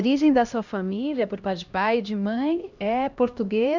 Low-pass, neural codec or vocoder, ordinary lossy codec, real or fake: 7.2 kHz; codec, 16 kHz, 1 kbps, X-Codec, HuBERT features, trained on LibriSpeech; none; fake